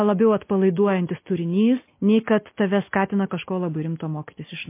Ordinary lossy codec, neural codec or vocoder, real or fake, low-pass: MP3, 24 kbps; none; real; 3.6 kHz